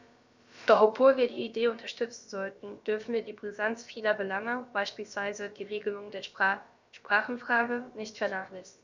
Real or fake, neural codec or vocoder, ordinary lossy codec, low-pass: fake; codec, 16 kHz, about 1 kbps, DyCAST, with the encoder's durations; MP3, 64 kbps; 7.2 kHz